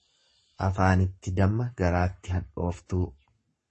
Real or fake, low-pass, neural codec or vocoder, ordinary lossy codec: fake; 10.8 kHz; codec, 44.1 kHz, 7.8 kbps, Pupu-Codec; MP3, 32 kbps